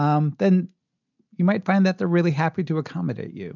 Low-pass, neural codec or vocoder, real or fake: 7.2 kHz; none; real